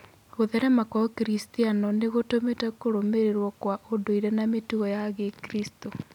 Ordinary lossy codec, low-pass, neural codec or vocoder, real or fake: none; 19.8 kHz; none; real